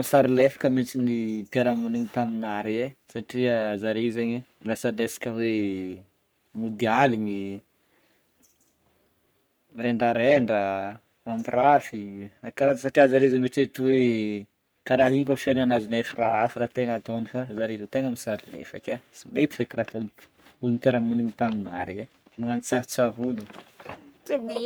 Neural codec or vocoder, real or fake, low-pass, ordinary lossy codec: codec, 44.1 kHz, 3.4 kbps, Pupu-Codec; fake; none; none